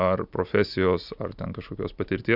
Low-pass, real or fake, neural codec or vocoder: 5.4 kHz; real; none